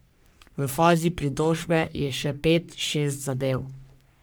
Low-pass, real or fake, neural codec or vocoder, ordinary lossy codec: none; fake; codec, 44.1 kHz, 3.4 kbps, Pupu-Codec; none